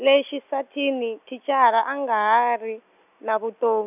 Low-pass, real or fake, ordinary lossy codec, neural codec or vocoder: 3.6 kHz; real; none; none